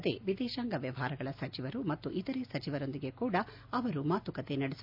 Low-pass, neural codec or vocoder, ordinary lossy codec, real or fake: 5.4 kHz; none; MP3, 48 kbps; real